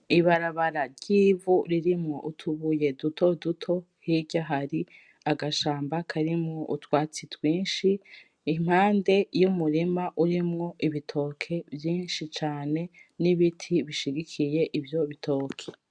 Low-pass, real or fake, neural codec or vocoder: 9.9 kHz; real; none